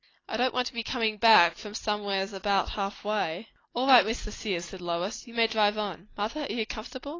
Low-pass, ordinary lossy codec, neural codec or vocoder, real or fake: 7.2 kHz; AAC, 32 kbps; none; real